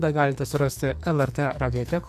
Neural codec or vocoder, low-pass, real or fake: codec, 44.1 kHz, 2.6 kbps, SNAC; 14.4 kHz; fake